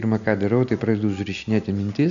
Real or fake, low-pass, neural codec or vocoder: real; 7.2 kHz; none